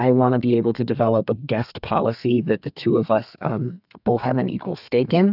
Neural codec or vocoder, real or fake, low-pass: codec, 32 kHz, 1.9 kbps, SNAC; fake; 5.4 kHz